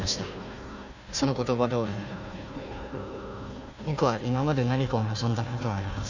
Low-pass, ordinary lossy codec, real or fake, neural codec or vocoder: 7.2 kHz; none; fake; codec, 16 kHz, 1 kbps, FunCodec, trained on Chinese and English, 50 frames a second